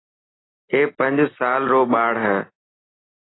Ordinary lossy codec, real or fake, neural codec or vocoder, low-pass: AAC, 16 kbps; real; none; 7.2 kHz